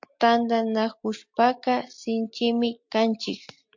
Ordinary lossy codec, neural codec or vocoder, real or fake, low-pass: MP3, 48 kbps; none; real; 7.2 kHz